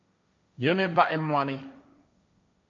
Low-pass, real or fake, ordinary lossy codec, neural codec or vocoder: 7.2 kHz; fake; MP3, 48 kbps; codec, 16 kHz, 1.1 kbps, Voila-Tokenizer